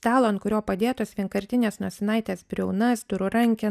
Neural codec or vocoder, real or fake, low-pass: none; real; 14.4 kHz